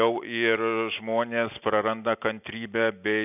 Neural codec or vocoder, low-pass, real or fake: none; 3.6 kHz; real